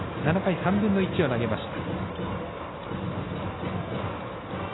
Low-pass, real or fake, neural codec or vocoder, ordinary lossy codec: 7.2 kHz; real; none; AAC, 16 kbps